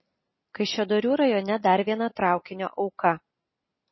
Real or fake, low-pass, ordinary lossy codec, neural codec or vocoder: real; 7.2 kHz; MP3, 24 kbps; none